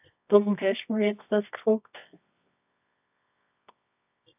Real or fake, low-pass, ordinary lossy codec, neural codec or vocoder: fake; 3.6 kHz; AAC, 32 kbps; codec, 24 kHz, 0.9 kbps, WavTokenizer, medium music audio release